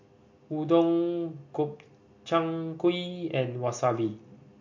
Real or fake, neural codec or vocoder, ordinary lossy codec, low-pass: real; none; MP3, 48 kbps; 7.2 kHz